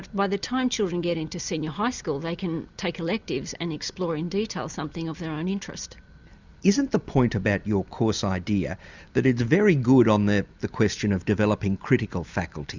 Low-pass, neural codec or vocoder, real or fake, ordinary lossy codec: 7.2 kHz; none; real; Opus, 64 kbps